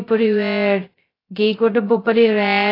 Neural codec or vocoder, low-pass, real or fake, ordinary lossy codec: codec, 16 kHz, 0.2 kbps, FocalCodec; 5.4 kHz; fake; AAC, 24 kbps